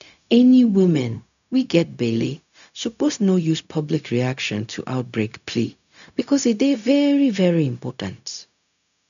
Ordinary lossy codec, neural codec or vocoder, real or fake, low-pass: none; codec, 16 kHz, 0.4 kbps, LongCat-Audio-Codec; fake; 7.2 kHz